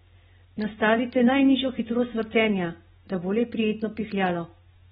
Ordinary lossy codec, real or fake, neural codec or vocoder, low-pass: AAC, 16 kbps; real; none; 7.2 kHz